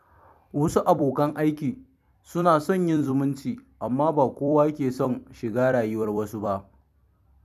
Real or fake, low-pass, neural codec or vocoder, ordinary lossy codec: fake; 14.4 kHz; vocoder, 44.1 kHz, 128 mel bands every 256 samples, BigVGAN v2; none